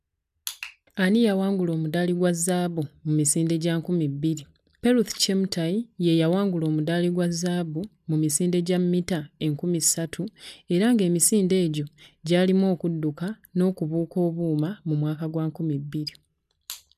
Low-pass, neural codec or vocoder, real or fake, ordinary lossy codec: 14.4 kHz; none; real; none